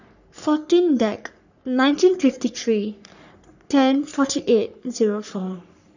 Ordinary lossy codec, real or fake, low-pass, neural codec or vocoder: none; fake; 7.2 kHz; codec, 44.1 kHz, 3.4 kbps, Pupu-Codec